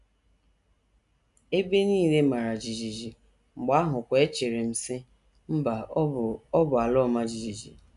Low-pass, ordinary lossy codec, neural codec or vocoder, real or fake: 10.8 kHz; none; none; real